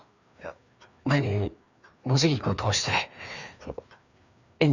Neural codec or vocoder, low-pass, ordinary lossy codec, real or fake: codec, 16 kHz, 2 kbps, FreqCodec, larger model; 7.2 kHz; none; fake